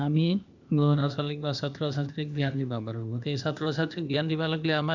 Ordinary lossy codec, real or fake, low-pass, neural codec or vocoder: none; fake; 7.2 kHz; codec, 16 kHz, 0.8 kbps, ZipCodec